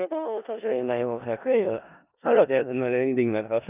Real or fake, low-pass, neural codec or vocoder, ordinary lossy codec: fake; 3.6 kHz; codec, 16 kHz in and 24 kHz out, 0.4 kbps, LongCat-Audio-Codec, four codebook decoder; none